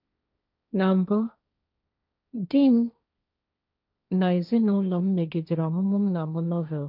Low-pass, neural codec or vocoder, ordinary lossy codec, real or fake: 5.4 kHz; codec, 16 kHz, 1.1 kbps, Voila-Tokenizer; none; fake